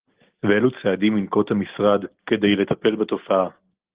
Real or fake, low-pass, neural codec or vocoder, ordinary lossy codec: real; 3.6 kHz; none; Opus, 16 kbps